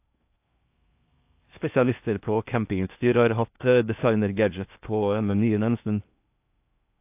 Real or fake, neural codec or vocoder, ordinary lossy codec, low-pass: fake; codec, 16 kHz in and 24 kHz out, 0.6 kbps, FocalCodec, streaming, 2048 codes; AAC, 32 kbps; 3.6 kHz